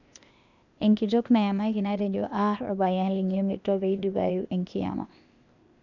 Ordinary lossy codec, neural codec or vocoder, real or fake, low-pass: none; codec, 16 kHz, 0.8 kbps, ZipCodec; fake; 7.2 kHz